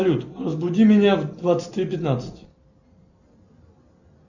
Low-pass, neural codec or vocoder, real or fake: 7.2 kHz; none; real